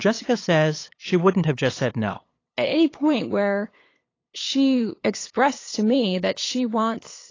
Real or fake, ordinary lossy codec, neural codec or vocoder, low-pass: fake; AAC, 32 kbps; codec, 16 kHz, 8 kbps, FunCodec, trained on LibriTTS, 25 frames a second; 7.2 kHz